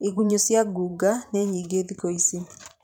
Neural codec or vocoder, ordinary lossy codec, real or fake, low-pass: vocoder, 44.1 kHz, 128 mel bands every 512 samples, BigVGAN v2; none; fake; 19.8 kHz